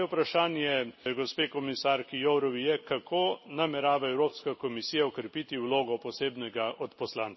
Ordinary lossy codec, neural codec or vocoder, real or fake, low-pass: MP3, 24 kbps; none; real; 7.2 kHz